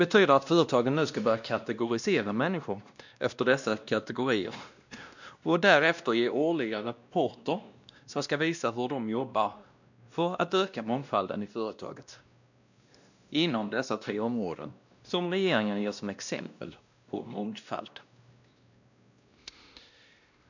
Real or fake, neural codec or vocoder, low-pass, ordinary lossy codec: fake; codec, 16 kHz, 1 kbps, X-Codec, WavLM features, trained on Multilingual LibriSpeech; 7.2 kHz; none